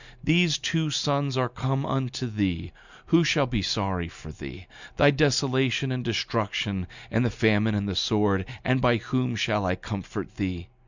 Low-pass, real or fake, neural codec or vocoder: 7.2 kHz; real; none